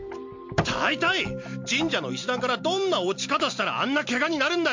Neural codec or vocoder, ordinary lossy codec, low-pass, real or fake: none; MP3, 48 kbps; 7.2 kHz; real